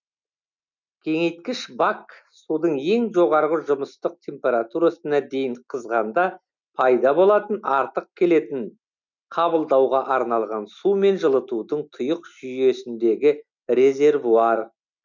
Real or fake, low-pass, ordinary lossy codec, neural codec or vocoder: real; 7.2 kHz; none; none